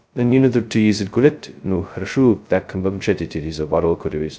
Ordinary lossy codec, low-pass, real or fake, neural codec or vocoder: none; none; fake; codec, 16 kHz, 0.2 kbps, FocalCodec